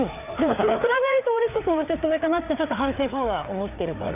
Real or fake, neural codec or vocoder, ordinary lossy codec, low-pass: fake; codec, 16 kHz, 4 kbps, FreqCodec, larger model; none; 3.6 kHz